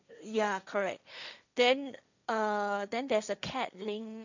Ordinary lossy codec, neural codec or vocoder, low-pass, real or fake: none; codec, 16 kHz, 1.1 kbps, Voila-Tokenizer; 7.2 kHz; fake